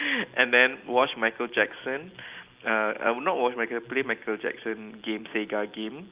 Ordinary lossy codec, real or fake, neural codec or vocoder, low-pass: Opus, 32 kbps; real; none; 3.6 kHz